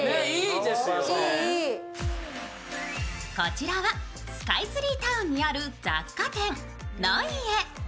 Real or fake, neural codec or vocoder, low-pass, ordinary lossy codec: real; none; none; none